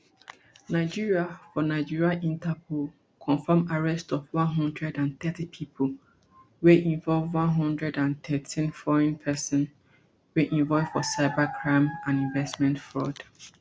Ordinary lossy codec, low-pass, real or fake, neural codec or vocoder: none; none; real; none